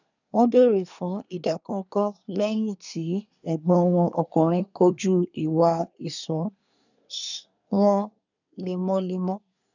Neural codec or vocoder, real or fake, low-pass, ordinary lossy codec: codec, 24 kHz, 1 kbps, SNAC; fake; 7.2 kHz; none